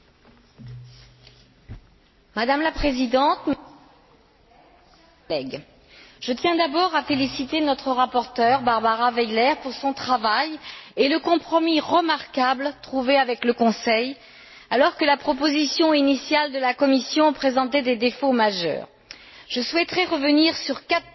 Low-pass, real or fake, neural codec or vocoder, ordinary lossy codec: 7.2 kHz; real; none; MP3, 24 kbps